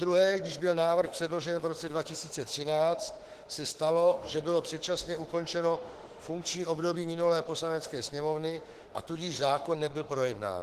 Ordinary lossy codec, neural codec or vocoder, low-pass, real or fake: Opus, 16 kbps; autoencoder, 48 kHz, 32 numbers a frame, DAC-VAE, trained on Japanese speech; 14.4 kHz; fake